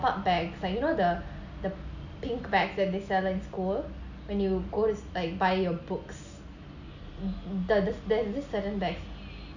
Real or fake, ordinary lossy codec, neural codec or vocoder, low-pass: real; none; none; 7.2 kHz